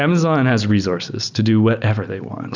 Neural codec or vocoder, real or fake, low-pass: none; real; 7.2 kHz